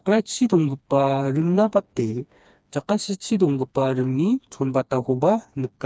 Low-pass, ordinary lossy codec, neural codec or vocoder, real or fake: none; none; codec, 16 kHz, 2 kbps, FreqCodec, smaller model; fake